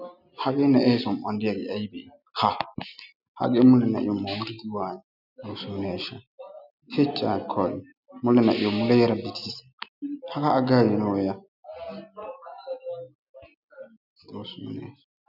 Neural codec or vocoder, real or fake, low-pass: none; real; 5.4 kHz